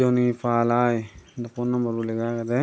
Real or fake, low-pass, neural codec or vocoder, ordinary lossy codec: real; none; none; none